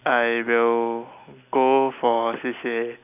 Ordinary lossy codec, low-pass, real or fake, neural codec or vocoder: none; 3.6 kHz; real; none